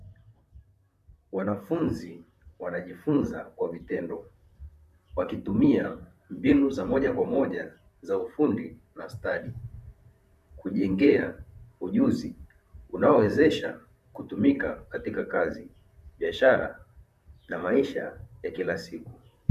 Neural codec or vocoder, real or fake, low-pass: vocoder, 44.1 kHz, 128 mel bands, Pupu-Vocoder; fake; 14.4 kHz